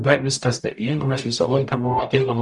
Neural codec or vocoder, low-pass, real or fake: codec, 44.1 kHz, 0.9 kbps, DAC; 10.8 kHz; fake